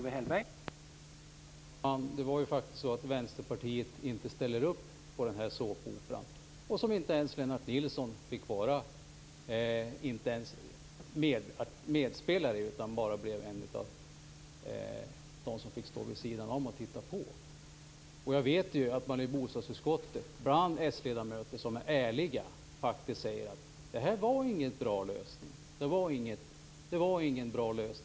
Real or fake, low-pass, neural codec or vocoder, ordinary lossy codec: real; none; none; none